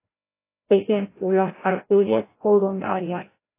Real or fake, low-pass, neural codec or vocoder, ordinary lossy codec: fake; 3.6 kHz; codec, 16 kHz, 0.5 kbps, FreqCodec, larger model; AAC, 24 kbps